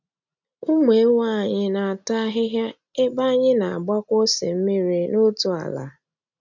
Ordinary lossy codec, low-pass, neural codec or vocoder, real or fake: none; 7.2 kHz; none; real